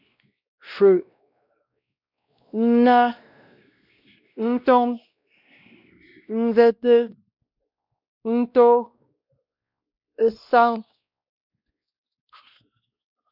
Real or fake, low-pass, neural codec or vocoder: fake; 5.4 kHz; codec, 16 kHz, 1 kbps, X-Codec, WavLM features, trained on Multilingual LibriSpeech